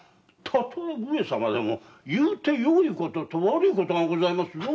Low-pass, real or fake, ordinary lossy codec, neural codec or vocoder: none; real; none; none